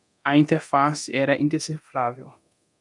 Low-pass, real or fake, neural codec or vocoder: 10.8 kHz; fake; codec, 24 kHz, 0.9 kbps, DualCodec